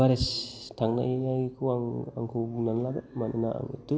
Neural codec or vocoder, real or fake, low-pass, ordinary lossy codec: none; real; none; none